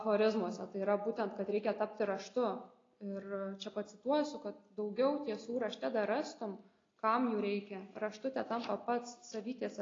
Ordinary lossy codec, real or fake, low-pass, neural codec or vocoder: AAC, 32 kbps; real; 7.2 kHz; none